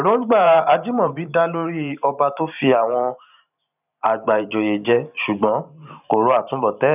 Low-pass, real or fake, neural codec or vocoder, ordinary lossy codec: 3.6 kHz; fake; vocoder, 44.1 kHz, 128 mel bands every 512 samples, BigVGAN v2; none